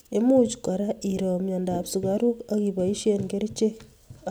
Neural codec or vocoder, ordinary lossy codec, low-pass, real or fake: none; none; none; real